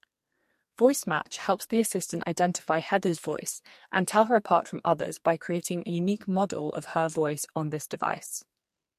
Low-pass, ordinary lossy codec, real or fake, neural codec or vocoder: 14.4 kHz; MP3, 64 kbps; fake; codec, 44.1 kHz, 2.6 kbps, SNAC